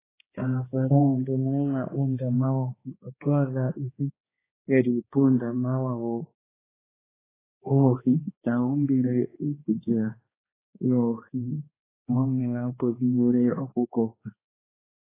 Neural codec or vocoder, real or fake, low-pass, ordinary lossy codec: codec, 16 kHz, 2 kbps, X-Codec, HuBERT features, trained on balanced general audio; fake; 3.6 kHz; AAC, 16 kbps